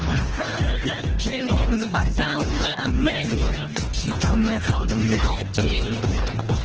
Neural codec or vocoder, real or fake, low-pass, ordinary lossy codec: codec, 24 kHz, 1.5 kbps, HILCodec; fake; 7.2 kHz; Opus, 16 kbps